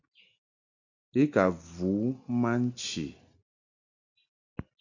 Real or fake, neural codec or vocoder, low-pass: real; none; 7.2 kHz